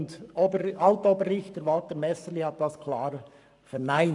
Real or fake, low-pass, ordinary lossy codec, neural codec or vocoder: fake; 10.8 kHz; none; codec, 44.1 kHz, 7.8 kbps, Pupu-Codec